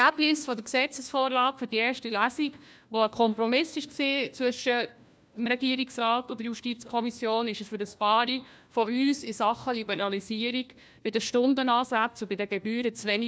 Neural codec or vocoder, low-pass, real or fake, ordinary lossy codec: codec, 16 kHz, 1 kbps, FunCodec, trained on LibriTTS, 50 frames a second; none; fake; none